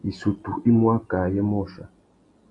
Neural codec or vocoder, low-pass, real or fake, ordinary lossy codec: none; 10.8 kHz; real; AAC, 48 kbps